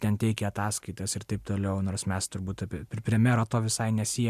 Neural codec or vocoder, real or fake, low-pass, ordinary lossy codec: none; real; 14.4 kHz; MP3, 96 kbps